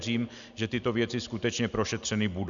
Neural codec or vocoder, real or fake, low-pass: none; real; 7.2 kHz